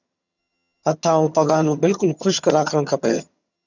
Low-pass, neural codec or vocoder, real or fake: 7.2 kHz; vocoder, 22.05 kHz, 80 mel bands, HiFi-GAN; fake